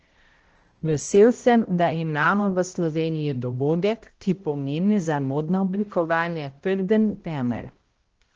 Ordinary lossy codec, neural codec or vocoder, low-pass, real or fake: Opus, 16 kbps; codec, 16 kHz, 0.5 kbps, X-Codec, HuBERT features, trained on balanced general audio; 7.2 kHz; fake